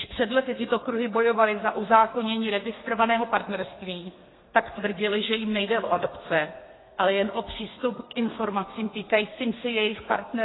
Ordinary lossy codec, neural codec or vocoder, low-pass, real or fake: AAC, 16 kbps; codec, 32 kHz, 1.9 kbps, SNAC; 7.2 kHz; fake